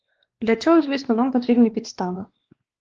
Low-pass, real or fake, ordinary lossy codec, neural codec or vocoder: 7.2 kHz; fake; Opus, 16 kbps; codec, 16 kHz, 2 kbps, X-Codec, WavLM features, trained on Multilingual LibriSpeech